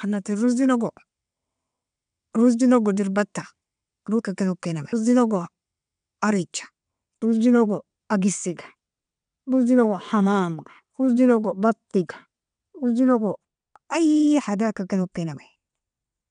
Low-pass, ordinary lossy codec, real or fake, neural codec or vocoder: 9.9 kHz; none; fake; vocoder, 22.05 kHz, 80 mel bands, WaveNeXt